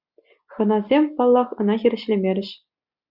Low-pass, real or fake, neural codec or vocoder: 5.4 kHz; real; none